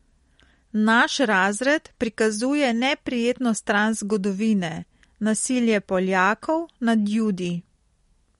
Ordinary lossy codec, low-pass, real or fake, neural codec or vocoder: MP3, 48 kbps; 19.8 kHz; real; none